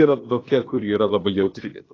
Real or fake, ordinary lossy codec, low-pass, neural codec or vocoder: fake; AAC, 32 kbps; 7.2 kHz; codec, 16 kHz, 0.8 kbps, ZipCodec